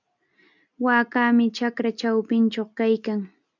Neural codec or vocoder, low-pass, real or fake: none; 7.2 kHz; real